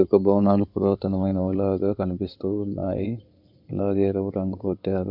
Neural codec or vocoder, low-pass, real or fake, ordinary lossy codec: codec, 16 kHz in and 24 kHz out, 2.2 kbps, FireRedTTS-2 codec; 5.4 kHz; fake; none